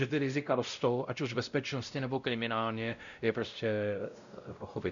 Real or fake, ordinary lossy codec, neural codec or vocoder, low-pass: fake; MP3, 96 kbps; codec, 16 kHz, 0.5 kbps, X-Codec, WavLM features, trained on Multilingual LibriSpeech; 7.2 kHz